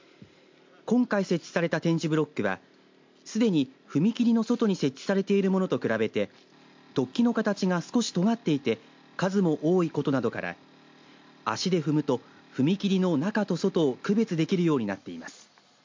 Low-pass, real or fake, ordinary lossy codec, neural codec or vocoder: 7.2 kHz; real; MP3, 48 kbps; none